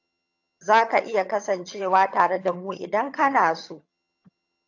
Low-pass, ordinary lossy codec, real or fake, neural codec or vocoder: 7.2 kHz; AAC, 48 kbps; fake; vocoder, 22.05 kHz, 80 mel bands, HiFi-GAN